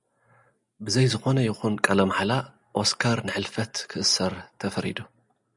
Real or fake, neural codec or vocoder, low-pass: real; none; 10.8 kHz